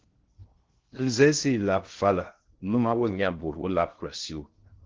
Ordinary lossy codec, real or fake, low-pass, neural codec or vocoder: Opus, 16 kbps; fake; 7.2 kHz; codec, 16 kHz in and 24 kHz out, 0.6 kbps, FocalCodec, streaming, 2048 codes